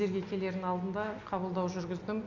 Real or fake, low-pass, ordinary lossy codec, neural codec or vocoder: real; 7.2 kHz; none; none